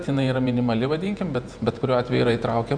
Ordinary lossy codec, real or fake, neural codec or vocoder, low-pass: Opus, 64 kbps; fake; vocoder, 48 kHz, 128 mel bands, Vocos; 9.9 kHz